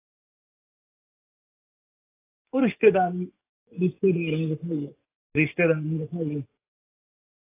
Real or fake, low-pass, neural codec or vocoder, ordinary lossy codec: fake; 3.6 kHz; codec, 44.1 kHz, 7.8 kbps, DAC; AAC, 16 kbps